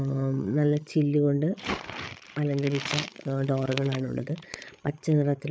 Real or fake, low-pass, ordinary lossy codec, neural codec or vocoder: fake; none; none; codec, 16 kHz, 8 kbps, FreqCodec, larger model